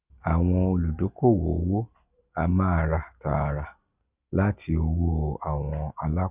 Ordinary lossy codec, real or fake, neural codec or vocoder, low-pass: none; real; none; 3.6 kHz